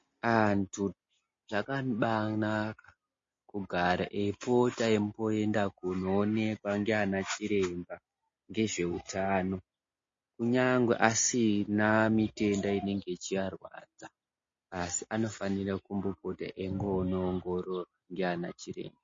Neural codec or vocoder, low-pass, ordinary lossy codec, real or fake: none; 7.2 kHz; MP3, 32 kbps; real